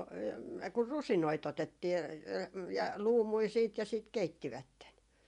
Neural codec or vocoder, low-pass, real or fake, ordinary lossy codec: vocoder, 24 kHz, 100 mel bands, Vocos; 10.8 kHz; fake; MP3, 96 kbps